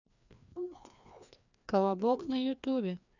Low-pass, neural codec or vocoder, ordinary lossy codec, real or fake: 7.2 kHz; codec, 16 kHz, 2 kbps, FreqCodec, larger model; none; fake